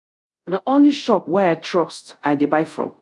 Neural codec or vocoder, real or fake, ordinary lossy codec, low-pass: codec, 24 kHz, 0.5 kbps, DualCodec; fake; none; 10.8 kHz